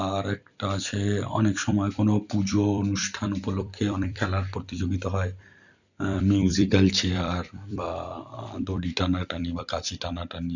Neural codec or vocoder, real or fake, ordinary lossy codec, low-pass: vocoder, 44.1 kHz, 128 mel bands every 256 samples, BigVGAN v2; fake; none; 7.2 kHz